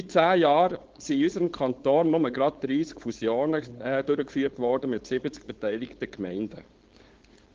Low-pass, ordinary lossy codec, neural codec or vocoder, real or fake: 7.2 kHz; Opus, 16 kbps; codec, 16 kHz, 4.8 kbps, FACodec; fake